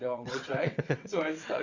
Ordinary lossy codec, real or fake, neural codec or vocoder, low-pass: Opus, 64 kbps; fake; vocoder, 22.05 kHz, 80 mel bands, WaveNeXt; 7.2 kHz